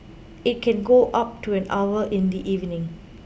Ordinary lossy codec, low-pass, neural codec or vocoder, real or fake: none; none; none; real